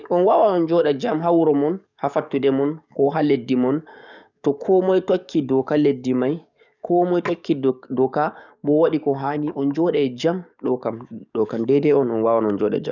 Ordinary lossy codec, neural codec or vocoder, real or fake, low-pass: none; codec, 44.1 kHz, 7.8 kbps, DAC; fake; 7.2 kHz